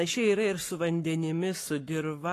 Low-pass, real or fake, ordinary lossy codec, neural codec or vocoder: 14.4 kHz; fake; AAC, 48 kbps; codec, 44.1 kHz, 7.8 kbps, Pupu-Codec